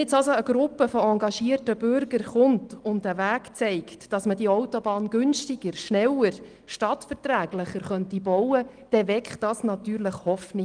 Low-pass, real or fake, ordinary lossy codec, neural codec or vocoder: 9.9 kHz; real; Opus, 24 kbps; none